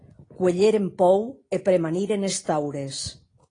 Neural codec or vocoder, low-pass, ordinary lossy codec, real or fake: none; 9.9 kHz; AAC, 32 kbps; real